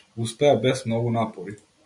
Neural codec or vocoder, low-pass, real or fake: none; 10.8 kHz; real